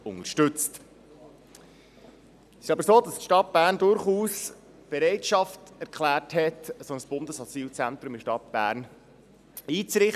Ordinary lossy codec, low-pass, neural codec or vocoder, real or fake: none; 14.4 kHz; none; real